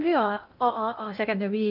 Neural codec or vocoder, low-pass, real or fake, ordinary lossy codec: codec, 16 kHz in and 24 kHz out, 0.8 kbps, FocalCodec, streaming, 65536 codes; 5.4 kHz; fake; none